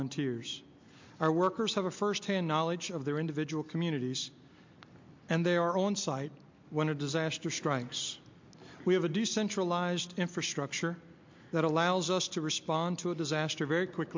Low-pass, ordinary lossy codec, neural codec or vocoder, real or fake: 7.2 kHz; MP3, 48 kbps; none; real